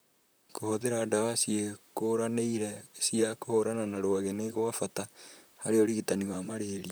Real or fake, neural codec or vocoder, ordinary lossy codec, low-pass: fake; vocoder, 44.1 kHz, 128 mel bands, Pupu-Vocoder; none; none